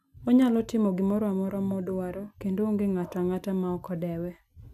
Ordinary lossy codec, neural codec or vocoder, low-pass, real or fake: none; none; 14.4 kHz; real